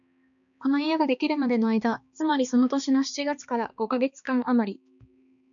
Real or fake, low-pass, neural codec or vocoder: fake; 7.2 kHz; codec, 16 kHz, 2 kbps, X-Codec, HuBERT features, trained on balanced general audio